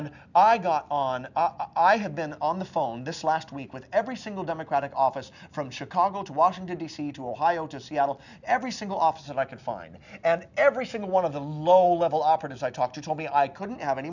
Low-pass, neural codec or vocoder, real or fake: 7.2 kHz; none; real